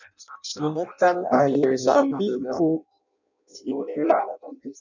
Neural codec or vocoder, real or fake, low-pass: codec, 16 kHz in and 24 kHz out, 0.6 kbps, FireRedTTS-2 codec; fake; 7.2 kHz